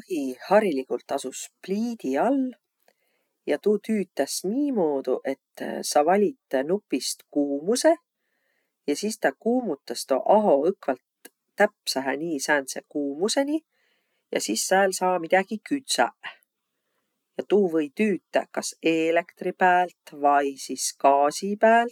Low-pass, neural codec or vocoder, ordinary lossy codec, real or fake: 19.8 kHz; none; none; real